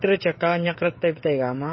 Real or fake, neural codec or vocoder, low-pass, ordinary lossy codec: fake; codec, 16 kHz, 16 kbps, FreqCodec, smaller model; 7.2 kHz; MP3, 24 kbps